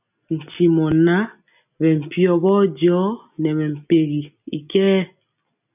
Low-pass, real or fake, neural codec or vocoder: 3.6 kHz; real; none